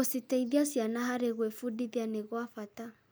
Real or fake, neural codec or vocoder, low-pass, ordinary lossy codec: real; none; none; none